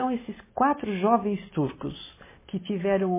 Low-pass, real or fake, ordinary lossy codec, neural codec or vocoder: 3.6 kHz; real; MP3, 16 kbps; none